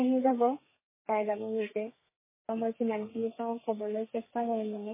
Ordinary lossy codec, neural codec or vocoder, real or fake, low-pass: MP3, 16 kbps; codec, 32 kHz, 1.9 kbps, SNAC; fake; 3.6 kHz